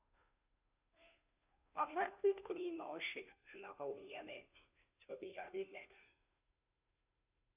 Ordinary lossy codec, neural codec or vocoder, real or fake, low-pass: none; codec, 16 kHz, 0.5 kbps, FunCodec, trained on Chinese and English, 25 frames a second; fake; 3.6 kHz